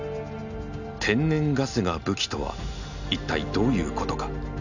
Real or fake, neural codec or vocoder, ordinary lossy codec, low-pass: real; none; none; 7.2 kHz